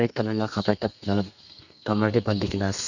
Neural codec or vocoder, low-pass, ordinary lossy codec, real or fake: codec, 32 kHz, 1.9 kbps, SNAC; 7.2 kHz; none; fake